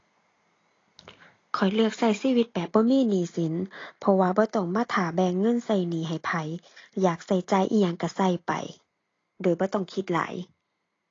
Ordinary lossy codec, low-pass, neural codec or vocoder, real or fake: AAC, 32 kbps; 7.2 kHz; none; real